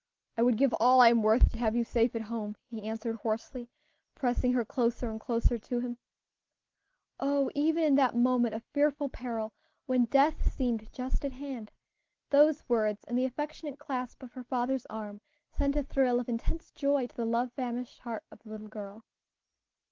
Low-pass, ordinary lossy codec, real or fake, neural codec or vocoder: 7.2 kHz; Opus, 16 kbps; real; none